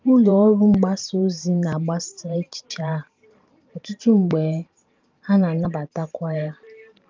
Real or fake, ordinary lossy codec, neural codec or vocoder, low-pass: fake; Opus, 32 kbps; vocoder, 44.1 kHz, 128 mel bands every 512 samples, BigVGAN v2; 7.2 kHz